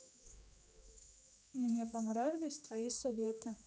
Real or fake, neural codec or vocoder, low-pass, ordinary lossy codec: fake; codec, 16 kHz, 2 kbps, X-Codec, HuBERT features, trained on general audio; none; none